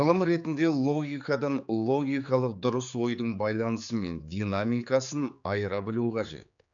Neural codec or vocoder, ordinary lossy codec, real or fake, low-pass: codec, 16 kHz, 4 kbps, X-Codec, HuBERT features, trained on general audio; none; fake; 7.2 kHz